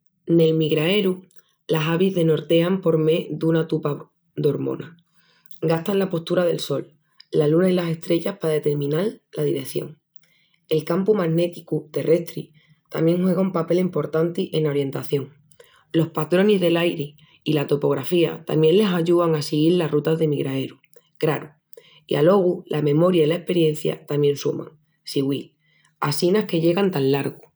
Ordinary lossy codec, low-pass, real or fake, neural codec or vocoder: none; none; real; none